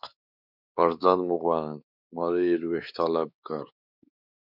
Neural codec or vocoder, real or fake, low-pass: codec, 16 kHz, 4 kbps, X-Codec, WavLM features, trained on Multilingual LibriSpeech; fake; 5.4 kHz